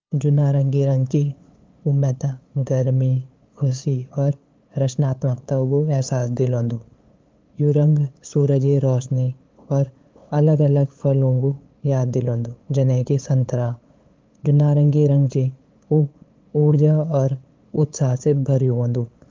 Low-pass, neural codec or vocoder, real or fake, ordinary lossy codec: 7.2 kHz; codec, 16 kHz, 8 kbps, FunCodec, trained on LibriTTS, 25 frames a second; fake; Opus, 24 kbps